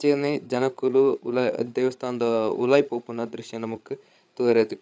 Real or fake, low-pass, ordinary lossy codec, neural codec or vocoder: fake; none; none; codec, 16 kHz, 8 kbps, FreqCodec, larger model